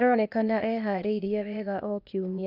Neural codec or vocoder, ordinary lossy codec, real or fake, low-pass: codec, 16 kHz, 0.8 kbps, ZipCodec; none; fake; 5.4 kHz